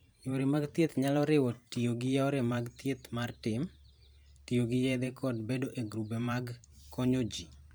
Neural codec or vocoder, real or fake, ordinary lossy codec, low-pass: vocoder, 44.1 kHz, 128 mel bands every 512 samples, BigVGAN v2; fake; none; none